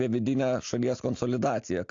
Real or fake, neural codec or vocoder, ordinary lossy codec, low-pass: real; none; AAC, 48 kbps; 7.2 kHz